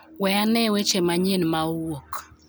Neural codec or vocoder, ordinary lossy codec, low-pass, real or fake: none; none; none; real